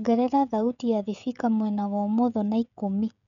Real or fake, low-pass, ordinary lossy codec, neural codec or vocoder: fake; 7.2 kHz; none; codec, 16 kHz, 16 kbps, FreqCodec, smaller model